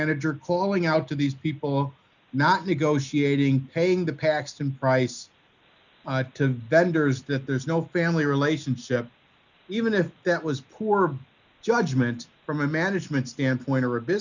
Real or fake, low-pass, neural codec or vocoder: real; 7.2 kHz; none